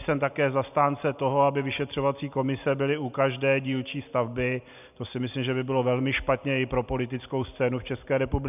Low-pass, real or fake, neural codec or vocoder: 3.6 kHz; real; none